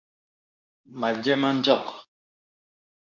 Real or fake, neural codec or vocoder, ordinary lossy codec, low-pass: fake; codec, 24 kHz, 0.9 kbps, WavTokenizer, medium speech release version 2; MP3, 64 kbps; 7.2 kHz